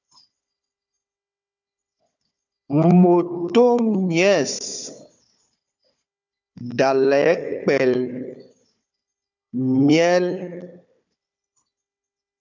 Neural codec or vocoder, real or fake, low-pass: codec, 16 kHz, 4 kbps, FunCodec, trained on Chinese and English, 50 frames a second; fake; 7.2 kHz